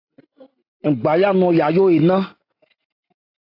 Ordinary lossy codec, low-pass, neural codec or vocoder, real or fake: AAC, 32 kbps; 5.4 kHz; none; real